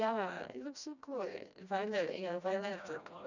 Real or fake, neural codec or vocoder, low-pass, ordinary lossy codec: fake; codec, 16 kHz, 1 kbps, FreqCodec, smaller model; 7.2 kHz; none